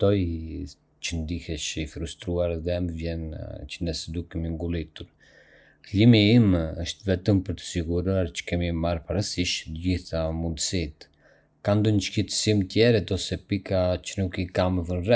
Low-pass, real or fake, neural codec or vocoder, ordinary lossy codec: none; real; none; none